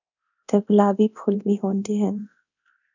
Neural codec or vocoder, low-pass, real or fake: codec, 24 kHz, 0.9 kbps, DualCodec; 7.2 kHz; fake